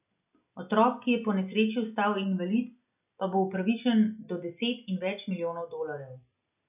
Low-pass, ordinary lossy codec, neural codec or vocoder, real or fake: 3.6 kHz; none; none; real